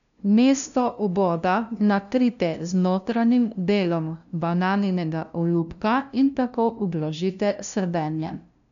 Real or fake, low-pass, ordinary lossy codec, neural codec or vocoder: fake; 7.2 kHz; none; codec, 16 kHz, 0.5 kbps, FunCodec, trained on LibriTTS, 25 frames a second